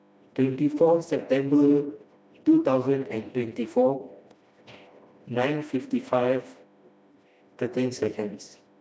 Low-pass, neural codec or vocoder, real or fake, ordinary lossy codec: none; codec, 16 kHz, 1 kbps, FreqCodec, smaller model; fake; none